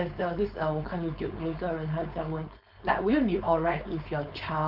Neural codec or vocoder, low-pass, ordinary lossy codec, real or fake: codec, 16 kHz, 4.8 kbps, FACodec; 5.4 kHz; none; fake